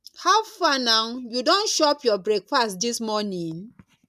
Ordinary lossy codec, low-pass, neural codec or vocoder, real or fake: none; 14.4 kHz; none; real